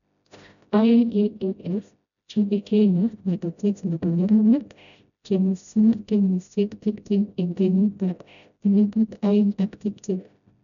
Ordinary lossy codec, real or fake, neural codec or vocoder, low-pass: none; fake; codec, 16 kHz, 0.5 kbps, FreqCodec, smaller model; 7.2 kHz